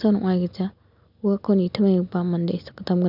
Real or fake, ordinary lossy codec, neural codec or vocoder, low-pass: real; none; none; 5.4 kHz